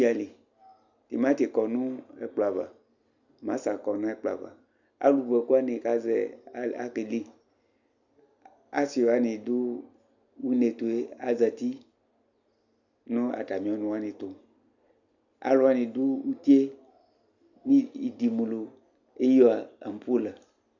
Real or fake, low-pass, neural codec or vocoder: real; 7.2 kHz; none